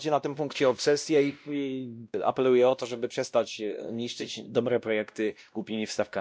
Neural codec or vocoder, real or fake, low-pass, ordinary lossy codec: codec, 16 kHz, 1 kbps, X-Codec, WavLM features, trained on Multilingual LibriSpeech; fake; none; none